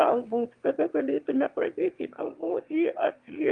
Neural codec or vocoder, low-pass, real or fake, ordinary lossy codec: autoencoder, 22.05 kHz, a latent of 192 numbers a frame, VITS, trained on one speaker; 9.9 kHz; fake; Opus, 32 kbps